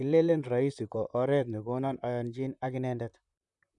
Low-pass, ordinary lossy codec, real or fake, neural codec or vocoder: 10.8 kHz; none; fake; vocoder, 44.1 kHz, 128 mel bands, Pupu-Vocoder